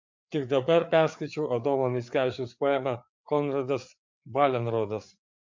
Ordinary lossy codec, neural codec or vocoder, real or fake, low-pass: MP3, 64 kbps; codec, 16 kHz, 4 kbps, FreqCodec, larger model; fake; 7.2 kHz